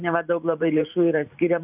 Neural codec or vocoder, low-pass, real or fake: vocoder, 44.1 kHz, 128 mel bands every 512 samples, BigVGAN v2; 3.6 kHz; fake